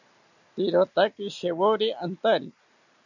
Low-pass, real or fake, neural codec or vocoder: 7.2 kHz; real; none